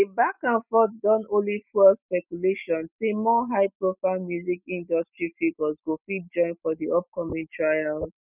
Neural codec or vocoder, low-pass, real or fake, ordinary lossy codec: none; 3.6 kHz; real; none